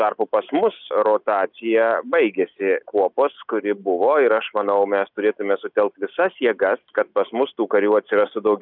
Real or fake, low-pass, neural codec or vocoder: real; 5.4 kHz; none